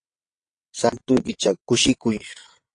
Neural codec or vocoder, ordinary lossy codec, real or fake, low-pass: vocoder, 22.05 kHz, 80 mel bands, WaveNeXt; AAC, 48 kbps; fake; 9.9 kHz